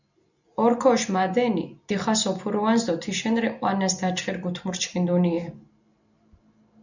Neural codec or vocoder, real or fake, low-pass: none; real; 7.2 kHz